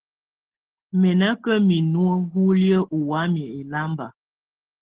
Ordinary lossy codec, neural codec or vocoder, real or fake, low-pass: Opus, 16 kbps; none; real; 3.6 kHz